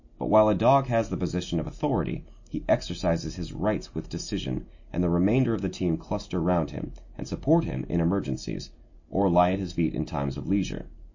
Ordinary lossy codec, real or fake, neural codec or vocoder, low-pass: MP3, 32 kbps; real; none; 7.2 kHz